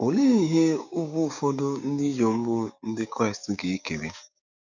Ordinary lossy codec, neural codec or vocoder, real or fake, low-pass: none; codec, 16 kHz, 6 kbps, DAC; fake; 7.2 kHz